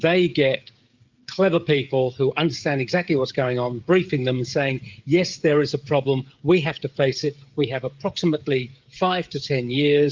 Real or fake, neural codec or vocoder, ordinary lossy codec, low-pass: fake; codec, 16 kHz, 16 kbps, FreqCodec, smaller model; Opus, 32 kbps; 7.2 kHz